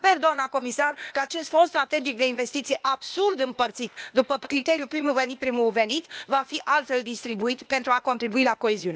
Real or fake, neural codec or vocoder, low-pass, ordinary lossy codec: fake; codec, 16 kHz, 0.8 kbps, ZipCodec; none; none